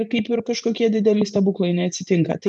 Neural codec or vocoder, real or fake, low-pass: vocoder, 44.1 kHz, 128 mel bands every 512 samples, BigVGAN v2; fake; 10.8 kHz